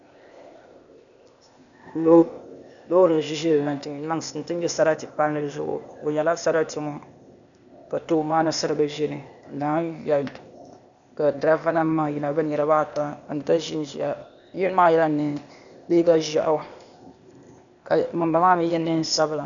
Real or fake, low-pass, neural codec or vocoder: fake; 7.2 kHz; codec, 16 kHz, 0.8 kbps, ZipCodec